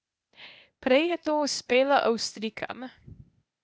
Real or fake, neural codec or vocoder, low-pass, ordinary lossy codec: fake; codec, 16 kHz, 0.8 kbps, ZipCodec; none; none